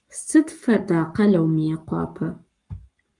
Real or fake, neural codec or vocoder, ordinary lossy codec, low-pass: fake; codec, 44.1 kHz, 7.8 kbps, Pupu-Codec; Opus, 32 kbps; 10.8 kHz